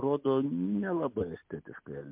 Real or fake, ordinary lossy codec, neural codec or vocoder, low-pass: real; MP3, 32 kbps; none; 3.6 kHz